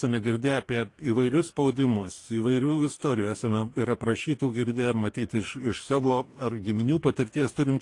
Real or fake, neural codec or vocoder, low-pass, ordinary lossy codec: fake; codec, 44.1 kHz, 2.6 kbps, DAC; 10.8 kHz; AAC, 48 kbps